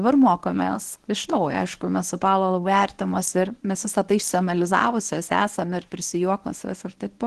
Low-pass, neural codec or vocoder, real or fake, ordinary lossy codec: 10.8 kHz; codec, 24 kHz, 0.9 kbps, WavTokenizer, medium speech release version 1; fake; Opus, 16 kbps